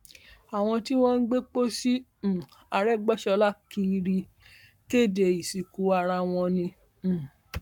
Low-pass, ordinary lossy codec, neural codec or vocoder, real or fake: 19.8 kHz; none; codec, 44.1 kHz, 7.8 kbps, Pupu-Codec; fake